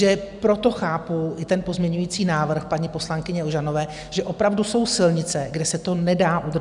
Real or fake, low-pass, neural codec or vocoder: real; 10.8 kHz; none